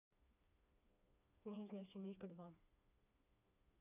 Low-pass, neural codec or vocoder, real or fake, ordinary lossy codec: 3.6 kHz; codec, 16 kHz, 2 kbps, FreqCodec, smaller model; fake; none